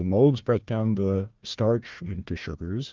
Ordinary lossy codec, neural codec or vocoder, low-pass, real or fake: Opus, 24 kbps; codec, 16 kHz, 1 kbps, FunCodec, trained on Chinese and English, 50 frames a second; 7.2 kHz; fake